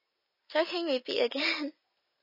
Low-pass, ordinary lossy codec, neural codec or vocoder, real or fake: 5.4 kHz; none; none; real